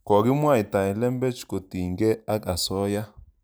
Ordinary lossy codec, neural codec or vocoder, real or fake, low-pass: none; none; real; none